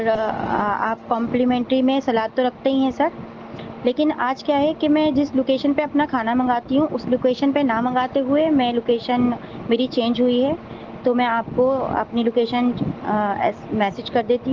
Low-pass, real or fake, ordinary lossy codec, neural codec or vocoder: 7.2 kHz; real; Opus, 16 kbps; none